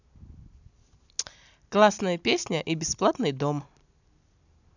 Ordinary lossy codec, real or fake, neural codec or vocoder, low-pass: none; real; none; 7.2 kHz